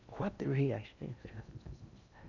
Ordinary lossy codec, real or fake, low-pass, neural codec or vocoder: none; fake; 7.2 kHz; codec, 16 kHz in and 24 kHz out, 0.6 kbps, FocalCodec, streaming, 4096 codes